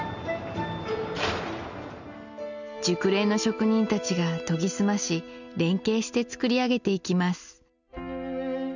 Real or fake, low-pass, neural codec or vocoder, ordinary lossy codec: real; 7.2 kHz; none; none